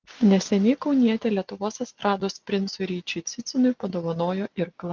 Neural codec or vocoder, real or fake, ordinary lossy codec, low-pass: none; real; Opus, 24 kbps; 7.2 kHz